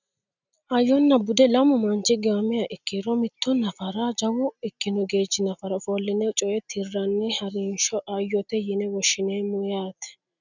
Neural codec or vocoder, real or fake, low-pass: none; real; 7.2 kHz